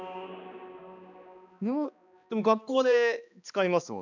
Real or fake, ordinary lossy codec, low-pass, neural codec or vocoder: fake; none; 7.2 kHz; codec, 16 kHz, 2 kbps, X-Codec, HuBERT features, trained on balanced general audio